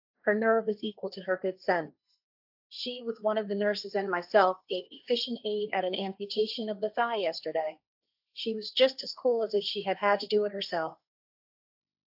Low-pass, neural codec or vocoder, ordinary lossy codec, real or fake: 5.4 kHz; codec, 16 kHz, 1.1 kbps, Voila-Tokenizer; AAC, 48 kbps; fake